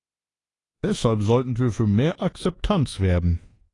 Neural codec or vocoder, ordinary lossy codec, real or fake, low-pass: codec, 24 kHz, 1.2 kbps, DualCodec; AAC, 32 kbps; fake; 10.8 kHz